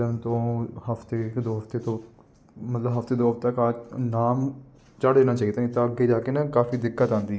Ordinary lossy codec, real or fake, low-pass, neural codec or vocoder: none; real; none; none